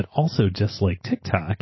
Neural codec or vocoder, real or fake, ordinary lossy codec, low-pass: none; real; MP3, 24 kbps; 7.2 kHz